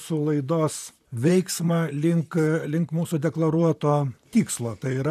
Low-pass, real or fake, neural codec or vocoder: 14.4 kHz; fake; vocoder, 44.1 kHz, 128 mel bands, Pupu-Vocoder